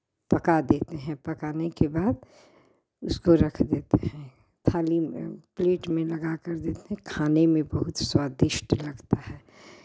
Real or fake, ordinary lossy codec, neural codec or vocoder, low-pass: real; none; none; none